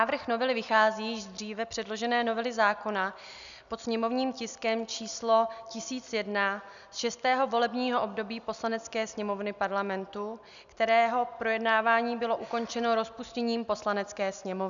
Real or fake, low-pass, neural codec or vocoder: real; 7.2 kHz; none